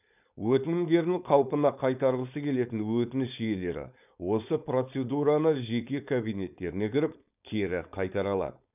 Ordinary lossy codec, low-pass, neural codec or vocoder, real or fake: none; 3.6 kHz; codec, 16 kHz, 4.8 kbps, FACodec; fake